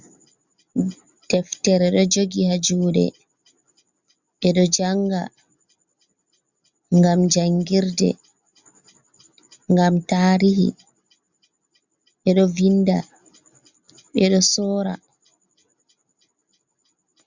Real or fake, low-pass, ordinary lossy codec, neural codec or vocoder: real; 7.2 kHz; Opus, 64 kbps; none